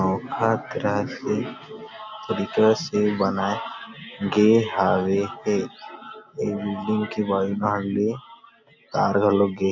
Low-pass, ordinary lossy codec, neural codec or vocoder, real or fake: 7.2 kHz; none; none; real